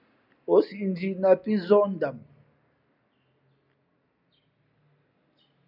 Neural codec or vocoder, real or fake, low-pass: none; real; 5.4 kHz